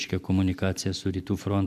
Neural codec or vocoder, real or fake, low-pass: none; real; 14.4 kHz